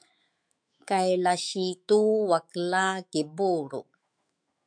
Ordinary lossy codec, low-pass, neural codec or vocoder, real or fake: MP3, 96 kbps; 9.9 kHz; autoencoder, 48 kHz, 128 numbers a frame, DAC-VAE, trained on Japanese speech; fake